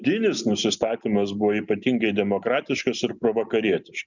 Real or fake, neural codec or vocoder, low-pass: real; none; 7.2 kHz